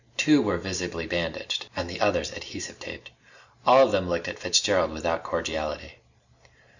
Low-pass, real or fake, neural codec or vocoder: 7.2 kHz; real; none